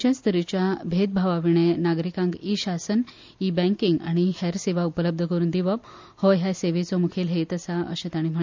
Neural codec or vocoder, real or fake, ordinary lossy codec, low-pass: none; real; MP3, 64 kbps; 7.2 kHz